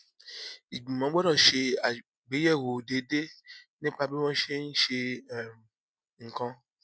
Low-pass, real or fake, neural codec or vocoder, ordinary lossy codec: none; real; none; none